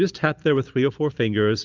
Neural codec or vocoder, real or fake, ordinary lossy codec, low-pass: none; real; Opus, 32 kbps; 7.2 kHz